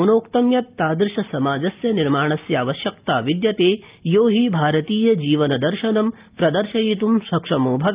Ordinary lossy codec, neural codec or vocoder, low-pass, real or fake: Opus, 24 kbps; none; 3.6 kHz; real